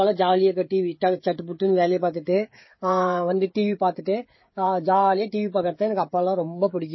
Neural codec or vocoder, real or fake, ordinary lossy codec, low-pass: codec, 16 kHz, 16 kbps, FreqCodec, smaller model; fake; MP3, 24 kbps; 7.2 kHz